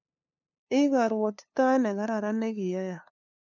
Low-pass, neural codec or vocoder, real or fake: 7.2 kHz; codec, 16 kHz, 2 kbps, FunCodec, trained on LibriTTS, 25 frames a second; fake